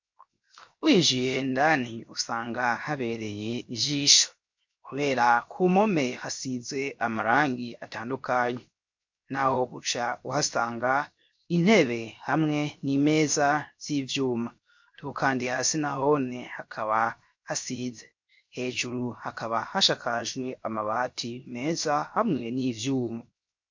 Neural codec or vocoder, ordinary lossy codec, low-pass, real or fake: codec, 16 kHz, 0.7 kbps, FocalCodec; MP3, 48 kbps; 7.2 kHz; fake